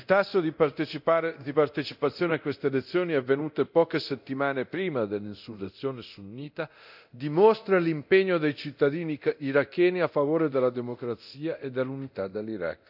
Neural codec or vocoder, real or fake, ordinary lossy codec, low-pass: codec, 24 kHz, 0.9 kbps, DualCodec; fake; none; 5.4 kHz